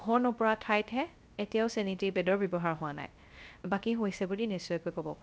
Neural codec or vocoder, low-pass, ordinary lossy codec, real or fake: codec, 16 kHz, 0.3 kbps, FocalCodec; none; none; fake